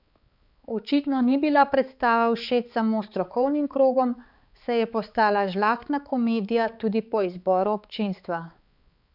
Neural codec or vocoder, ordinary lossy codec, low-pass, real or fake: codec, 16 kHz, 4 kbps, X-Codec, HuBERT features, trained on balanced general audio; none; 5.4 kHz; fake